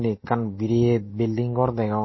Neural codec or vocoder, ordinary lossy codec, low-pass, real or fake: none; MP3, 24 kbps; 7.2 kHz; real